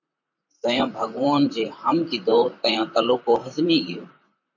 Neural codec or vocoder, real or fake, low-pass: vocoder, 44.1 kHz, 128 mel bands, Pupu-Vocoder; fake; 7.2 kHz